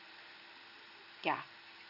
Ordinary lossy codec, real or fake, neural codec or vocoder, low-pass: none; real; none; 5.4 kHz